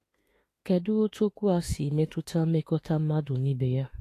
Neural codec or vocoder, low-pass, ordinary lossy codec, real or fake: autoencoder, 48 kHz, 32 numbers a frame, DAC-VAE, trained on Japanese speech; 14.4 kHz; AAC, 48 kbps; fake